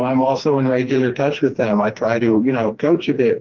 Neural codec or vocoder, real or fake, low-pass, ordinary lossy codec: codec, 16 kHz, 2 kbps, FreqCodec, smaller model; fake; 7.2 kHz; Opus, 32 kbps